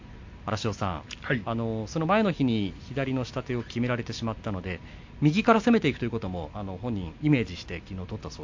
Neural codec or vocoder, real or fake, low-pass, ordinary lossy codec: none; real; 7.2 kHz; MP3, 48 kbps